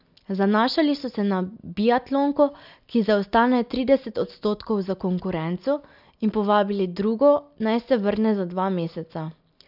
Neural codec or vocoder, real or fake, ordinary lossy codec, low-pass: none; real; none; 5.4 kHz